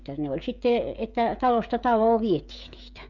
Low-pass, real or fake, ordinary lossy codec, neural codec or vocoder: 7.2 kHz; fake; none; codec, 16 kHz, 16 kbps, FreqCodec, smaller model